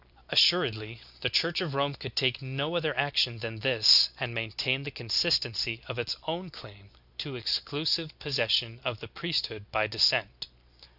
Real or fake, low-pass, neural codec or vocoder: real; 5.4 kHz; none